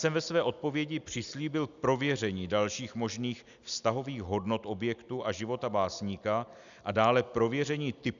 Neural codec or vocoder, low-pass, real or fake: none; 7.2 kHz; real